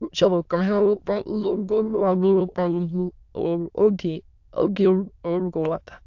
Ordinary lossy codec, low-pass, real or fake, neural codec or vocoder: AAC, 48 kbps; 7.2 kHz; fake; autoencoder, 22.05 kHz, a latent of 192 numbers a frame, VITS, trained on many speakers